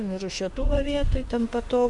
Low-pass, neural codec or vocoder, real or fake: 10.8 kHz; autoencoder, 48 kHz, 32 numbers a frame, DAC-VAE, trained on Japanese speech; fake